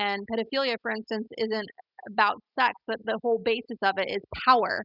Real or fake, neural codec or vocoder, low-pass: real; none; 5.4 kHz